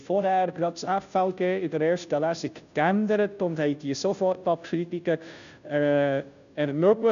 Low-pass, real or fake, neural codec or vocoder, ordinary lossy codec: 7.2 kHz; fake; codec, 16 kHz, 0.5 kbps, FunCodec, trained on Chinese and English, 25 frames a second; none